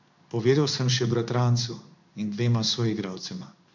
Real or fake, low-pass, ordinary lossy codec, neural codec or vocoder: fake; 7.2 kHz; none; codec, 16 kHz in and 24 kHz out, 1 kbps, XY-Tokenizer